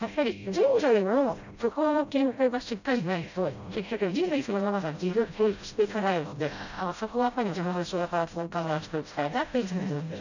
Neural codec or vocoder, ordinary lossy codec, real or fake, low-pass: codec, 16 kHz, 0.5 kbps, FreqCodec, smaller model; none; fake; 7.2 kHz